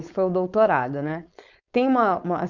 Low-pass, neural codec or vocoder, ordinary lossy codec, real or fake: 7.2 kHz; codec, 16 kHz, 4.8 kbps, FACodec; none; fake